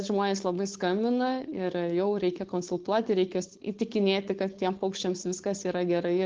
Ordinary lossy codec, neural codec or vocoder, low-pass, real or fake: Opus, 16 kbps; codec, 16 kHz, 4.8 kbps, FACodec; 7.2 kHz; fake